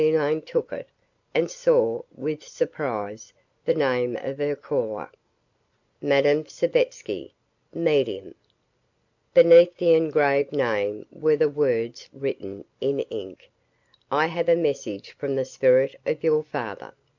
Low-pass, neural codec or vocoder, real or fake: 7.2 kHz; none; real